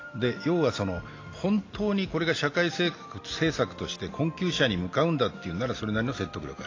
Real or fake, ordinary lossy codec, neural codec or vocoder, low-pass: real; AAC, 32 kbps; none; 7.2 kHz